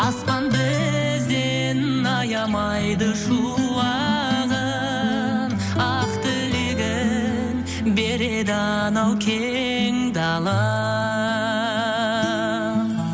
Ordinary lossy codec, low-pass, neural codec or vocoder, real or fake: none; none; none; real